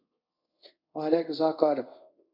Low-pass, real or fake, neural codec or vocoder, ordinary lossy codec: 5.4 kHz; fake; codec, 24 kHz, 0.5 kbps, DualCodec; MP3, 48 kbps